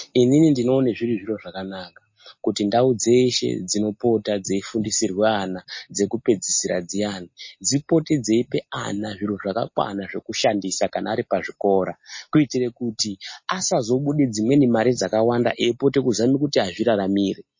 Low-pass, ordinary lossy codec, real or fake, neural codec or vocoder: 7.2 kHz; MP3, 32 kbps; real; none